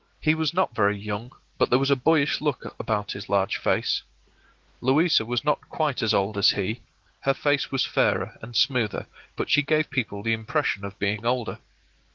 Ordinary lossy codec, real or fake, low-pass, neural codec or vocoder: Opus, 16 kbps; real; 7.2 kHz; none